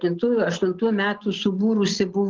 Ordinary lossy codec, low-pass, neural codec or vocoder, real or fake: Opus, 16 kbps; 7.2 kHz; none; real